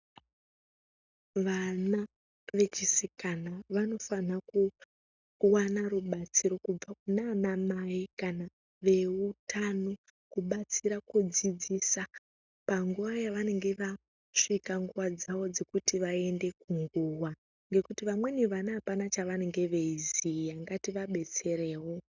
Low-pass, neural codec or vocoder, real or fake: 7.2 kHz; none; real